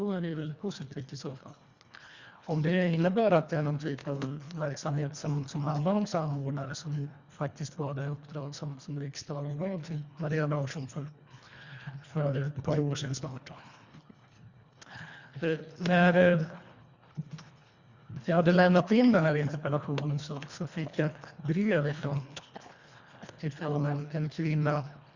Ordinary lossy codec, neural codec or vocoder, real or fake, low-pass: Opus, 64 kbps; codec, 24 kHz, 1.5 kbps, HILCodec; fake; 7.2 kHz